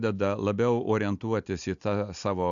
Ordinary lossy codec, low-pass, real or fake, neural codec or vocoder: MP3, 96 kbps; 7.2 kHz; real; none